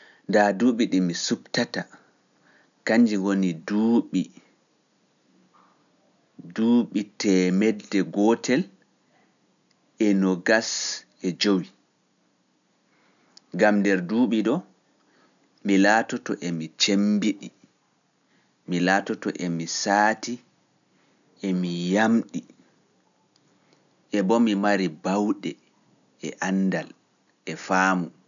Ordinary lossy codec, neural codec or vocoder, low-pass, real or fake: none; none; 7.2 kHz; real